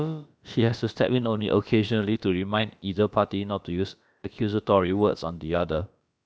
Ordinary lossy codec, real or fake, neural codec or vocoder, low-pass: none; fake; codec, 16 kHz, about 1 kbps, DyCAST, with the encoder's durations; none